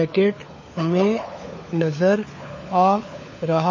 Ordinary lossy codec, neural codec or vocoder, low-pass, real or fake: MP3, 32 kbps; codec, 16 kHz, 4 kbps, FunCodec, trained on LibriTTS, 50 frames a second; 7.2 kHz; fake